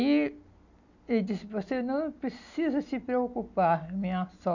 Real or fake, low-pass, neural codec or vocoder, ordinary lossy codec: real; 7.2 kHz; none; none